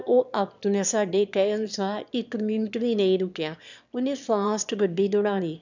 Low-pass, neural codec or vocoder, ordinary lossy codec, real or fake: 7.2 kHz; autoencoder, 22.05 kHz, a latent of 192 numbers a frame, VITS, trained on one speaker; none; fake